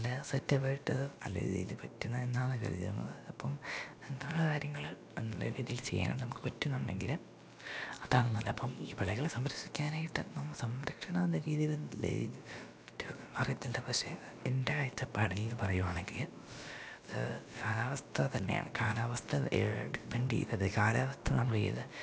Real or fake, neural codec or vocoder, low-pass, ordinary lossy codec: fake; codec, 16 kHz, about 1 kbps, DyCAST, with the encoder's durations; none; none